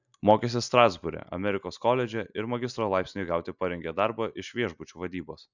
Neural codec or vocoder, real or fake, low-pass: none; real; 7.2 kHz